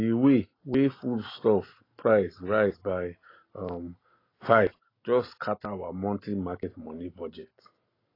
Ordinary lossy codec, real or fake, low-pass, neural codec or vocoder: AAC, 24 kbps; real; 5.4 kHz; none